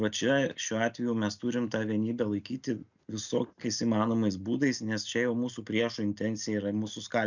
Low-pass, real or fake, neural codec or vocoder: 7.2 kHz; real; none